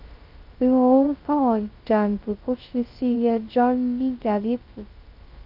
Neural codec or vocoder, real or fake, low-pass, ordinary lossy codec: codec, 16 kHz, 0.2 kbps, FocalCodec; fake; 5.4 kHz; Opus, 24 kbps